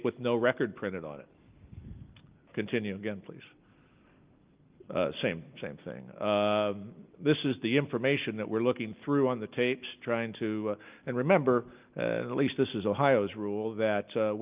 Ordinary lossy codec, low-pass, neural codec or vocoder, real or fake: Opus, 24 kbps; 3.6 kHz; none; real